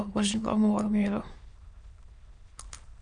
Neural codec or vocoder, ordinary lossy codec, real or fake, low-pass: autoencoder, 22.05 kHz, a latent of 192 numbers a frame, VITS, trained on many speakers; Opus, 64 kbps; fake; 9.9 kHz